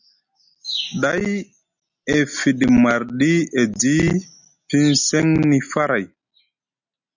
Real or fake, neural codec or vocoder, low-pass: real; none; 7.2 kHz